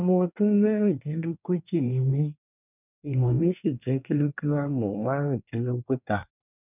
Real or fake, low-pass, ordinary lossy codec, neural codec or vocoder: fake; 3.6 kHz; none; codec, 24 kHz, 1 kbps, SNAC